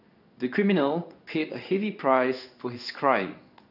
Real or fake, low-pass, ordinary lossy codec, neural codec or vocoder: fake; 5.4 kHz; none; codec, 16 kHz in and 24 kHz out, 1 kbps, XY-Tokenizer